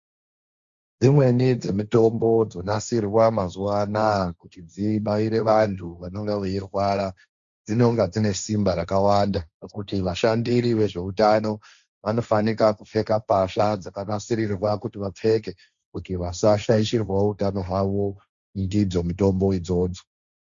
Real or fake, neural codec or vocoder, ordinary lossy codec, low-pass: fake; codec, 16 kHz, 1.1 kbps, Voila-Tokenizer; Opus, 64 kbps; 7.2 kHz